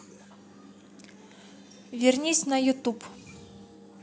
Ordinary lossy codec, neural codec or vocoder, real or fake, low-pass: none; none; real; none